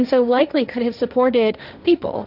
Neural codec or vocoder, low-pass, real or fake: codec, 16 kHz, 1.1 kbps, Voila-Tokenizer; 5.4 kHz; fake